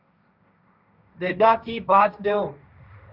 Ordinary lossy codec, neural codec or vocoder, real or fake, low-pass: Opus, 64 kbps; codec, 16 kHz, 1.1 kbps, Voila-Tokenizer; fake; 5.4 kHz